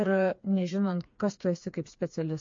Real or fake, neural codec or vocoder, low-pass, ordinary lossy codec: fake; codec, 16 kHz, 4 kbps, FreqCodec, smaller model; 7.2 kHz; MP3, 48 kbps